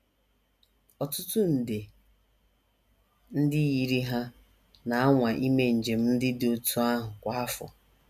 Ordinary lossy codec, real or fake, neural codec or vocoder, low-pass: none; real; none; 14.4 kHz